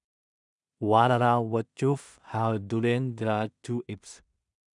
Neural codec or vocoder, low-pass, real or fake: codec, 16 kHz in and 24 kHz out, 0.4 kbps, LongCat-Audio-Codec, two codebook decoder; 10.8 kHz; fake